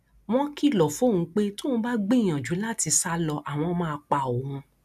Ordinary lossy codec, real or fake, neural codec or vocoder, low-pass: none; real; none; 14.4 kHz